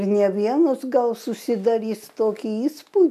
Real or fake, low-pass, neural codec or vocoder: real; 14.4 kHz; none